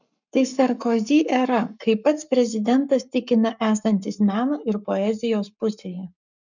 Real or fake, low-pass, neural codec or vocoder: fake; 7.2 kHz; codec, 44.1 kHz, 7.8 kbps, Pupu-Codec